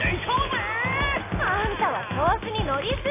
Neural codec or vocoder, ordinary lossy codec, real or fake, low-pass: none; AAC, 16 kbps; real; 3.6 kHz